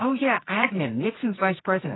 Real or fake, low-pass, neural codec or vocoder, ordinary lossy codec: fake; 7.2 kHz; codec, 24 kHz, 0.9 kbps, WavTokenizer, medium music audio release; AAC, 16 kbps